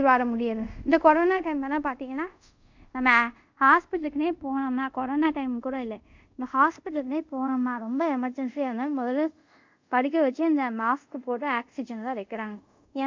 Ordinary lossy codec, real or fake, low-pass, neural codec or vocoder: MP3, 64 kbps; fake; 7.2 kHz; codec, 24 kHz, 0.5 kbps, DualCodec